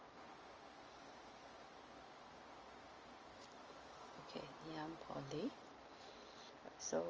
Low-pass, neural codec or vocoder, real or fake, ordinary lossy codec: 7.2 kHz; none; real; Opus, 24 kbps